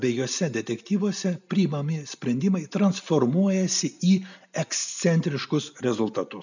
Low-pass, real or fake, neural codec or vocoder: 7.2 kHz; real; none